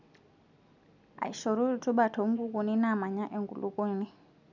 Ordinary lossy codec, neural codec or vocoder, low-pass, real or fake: none; none; 7.2 kHz; real